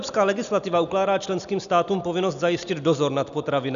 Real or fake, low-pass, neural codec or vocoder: real; 7.2 kHz; none